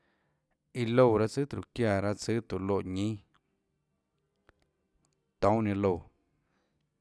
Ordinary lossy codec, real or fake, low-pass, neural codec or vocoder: none; real; none; none